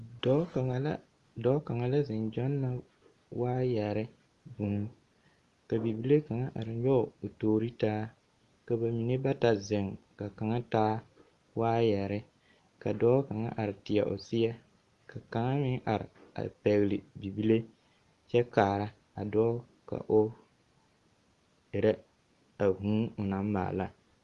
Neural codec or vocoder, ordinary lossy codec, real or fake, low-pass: none; Opus, 24 kbps; real; 10.8 kHz